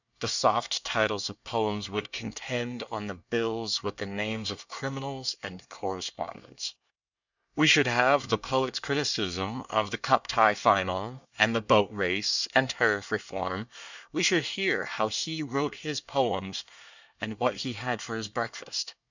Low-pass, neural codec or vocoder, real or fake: 7.2 kHz; codec, 24 kHz, 1 kbps, SNAC; fake